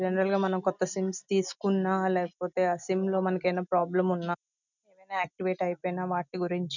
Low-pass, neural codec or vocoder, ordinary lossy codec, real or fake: 7.2 kHz; none; none; real